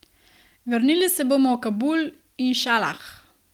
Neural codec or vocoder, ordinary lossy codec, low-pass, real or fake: none; Opus, 24 kbps; 19.8 kHz; real